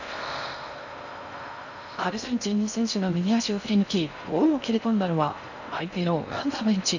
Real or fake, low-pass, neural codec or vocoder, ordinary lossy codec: fake; 7.2 kHz; codec, 16 kHz in and 24 kHz out, 0.6 kbps, FocalCodec, streaming, 4096 codes; none